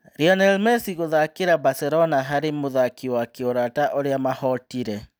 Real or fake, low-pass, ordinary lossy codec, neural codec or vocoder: real; none; none; none